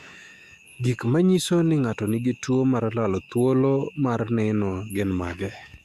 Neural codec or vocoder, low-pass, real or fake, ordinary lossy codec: autoencoder, 48 kHz, 128 numbers a frame, DAC-VAE, trained on Japanese speech; 14.4 kHz; fake; Opus, 64 kbps